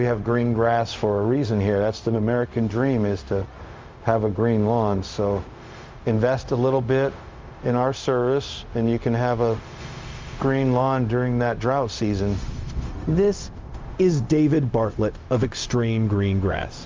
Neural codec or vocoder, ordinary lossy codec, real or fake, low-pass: codec, 16 kHz, 0.4 kbps, LongCat-Audio-Codec; Opus, 24 kbps; fake; 7.2 kHz